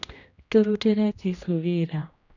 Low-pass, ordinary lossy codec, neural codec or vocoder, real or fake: 7.2 kHz; none; codec, 16 kHz, 1 kbps, X-Codec, HuBERT features, trained on general audio; fake